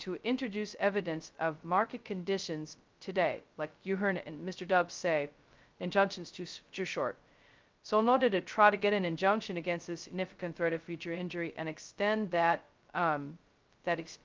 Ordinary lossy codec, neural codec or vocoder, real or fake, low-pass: Opus, 24 kbps; codec, 16 kHz, 0.2 kbps, FocalCodec; fake; 7.2 kHz